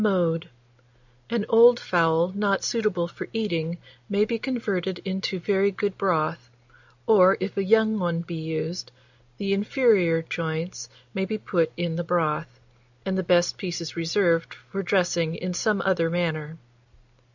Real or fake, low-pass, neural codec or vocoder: real; 7.2 kHz; none